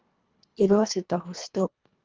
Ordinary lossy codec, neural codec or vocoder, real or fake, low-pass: Opus, 24 kbps; codec, 24 kHz, 1.5 kbps, HILCodec; fake; 7.2 kHz